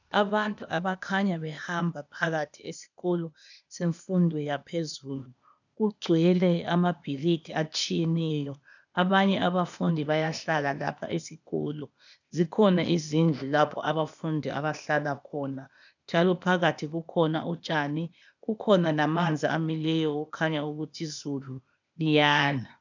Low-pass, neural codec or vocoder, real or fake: 7.2 kHz; codec, 16 kHz, 0.8 kbps, ZipCodec; fake